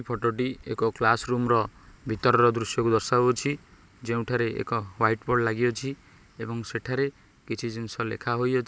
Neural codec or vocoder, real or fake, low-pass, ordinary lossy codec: none; real; none; none